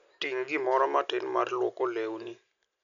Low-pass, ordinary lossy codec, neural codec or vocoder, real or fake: 7.2 kHz; none; none; real